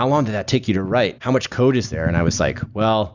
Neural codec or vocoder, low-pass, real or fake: none; 7.2 kHz; real